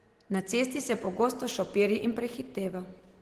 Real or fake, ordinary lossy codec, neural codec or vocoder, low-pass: real; Opus, 16 kbps; none; 14.4 kHz